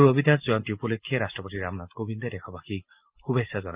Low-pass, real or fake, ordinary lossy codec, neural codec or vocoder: 3.6 kHz; real; Opus, 24 kbps; none